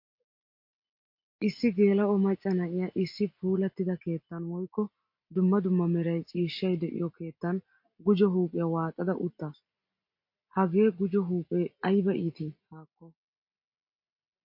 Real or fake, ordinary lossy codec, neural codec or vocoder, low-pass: real; MP3, 32 kbps; none; 5.4 kHz